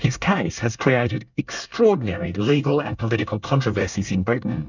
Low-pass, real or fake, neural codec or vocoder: 7.2 kHz; fake; codec, 24 kHz, 1 kbps, SNAC